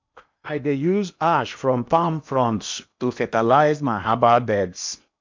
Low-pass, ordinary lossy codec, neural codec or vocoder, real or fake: 7.2 kHz; MP3, 64 kbps; codec, 16 kHz in and 24 kHz out, 0.8 kbps, FocalCodec, streaming, 65536 codes; fake